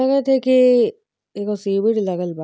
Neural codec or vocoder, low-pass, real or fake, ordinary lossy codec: none; none; real; none